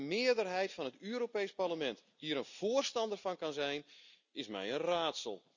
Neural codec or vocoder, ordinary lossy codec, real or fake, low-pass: none; none; real; 7.2 kHz